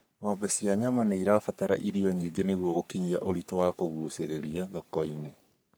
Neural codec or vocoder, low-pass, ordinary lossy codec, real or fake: codec, 44.1 kHz, 3.4 kbps, Pupu-Codec; none; none; fake